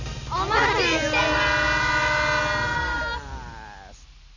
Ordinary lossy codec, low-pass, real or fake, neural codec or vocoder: none; 7.2 kHz; real; none